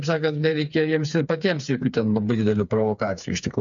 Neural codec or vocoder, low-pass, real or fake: codec, 16 kHz, 4 kbps, FreqCodec, smaller model; 7.2 kHz; fake